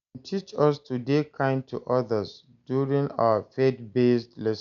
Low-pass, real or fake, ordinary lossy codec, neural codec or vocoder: 7.2 kHz; real; none; none